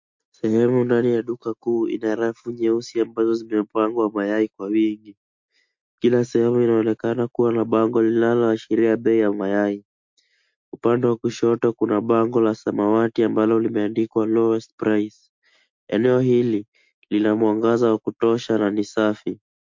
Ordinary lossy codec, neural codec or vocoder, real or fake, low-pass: MP3, 48 kbps; none; real; 7.2 kHz